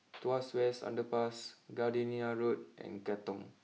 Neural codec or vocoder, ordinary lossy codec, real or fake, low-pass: none; none; real; none